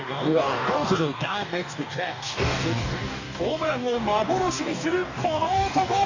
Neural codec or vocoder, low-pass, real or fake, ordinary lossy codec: codec, 44.1 kHz, 2.6 kbps, DAC; 7.2 kHz; fake; none